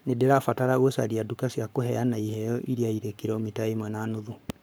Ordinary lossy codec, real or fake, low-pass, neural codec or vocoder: none; fake; none; codec, 44.1 kHz, 7.8 kbps, Pupu-Codec